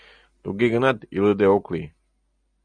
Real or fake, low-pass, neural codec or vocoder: real; 9.9 kHz; none